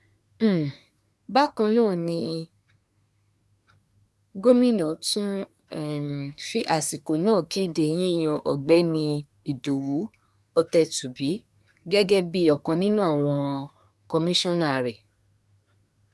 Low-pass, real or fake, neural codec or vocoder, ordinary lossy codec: none; fake; codec, 24 kHz, 1 kbps, SNAC; none